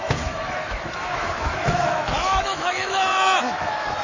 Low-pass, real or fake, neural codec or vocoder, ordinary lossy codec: 7.2 kHz; fake; autoencoder, 48 kHz, 128 numbers a frame, DAC-VAE, trained on Japanese speech; MP3, 32 kbps